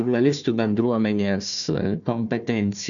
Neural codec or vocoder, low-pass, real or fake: codec, 16 kHz, 1 kbps, FunCodec, trained on Chinese and English, 50 frames a second; 7.2 kHz; fake